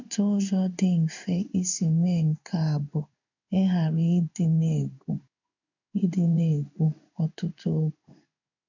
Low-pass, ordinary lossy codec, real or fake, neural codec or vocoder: 7.2 kHz; none; fake; codec, 16 kHz in and 24 kHz out, 1 kbps, XY-Tokenizer